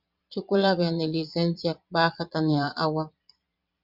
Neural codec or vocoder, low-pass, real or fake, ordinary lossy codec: vocoder, 22.05 kHz, 80 mel bands, Vocos; 5.4 kHz; fake; Opus, 64 kbps